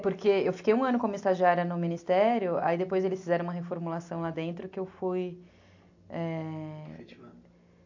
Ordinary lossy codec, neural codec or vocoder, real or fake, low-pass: AAC, 48 kbps; none; real; 7.2 kHz